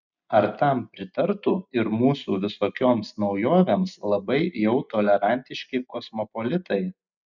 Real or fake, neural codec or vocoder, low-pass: real; none; 7.2 kHz